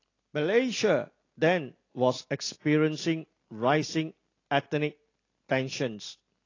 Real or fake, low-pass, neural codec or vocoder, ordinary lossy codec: real; 7.2 kHz; none; AAC, 32 kbps